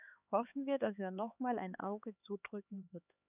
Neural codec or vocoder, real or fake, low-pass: codec, 16 kHz, 4 kbps, X-Codec, HuBERT features, trained on LibriSpeech; fake; 3.6 kHz